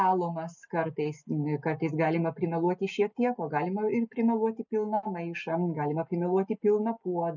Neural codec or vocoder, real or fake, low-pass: none; real; 7.2 kHz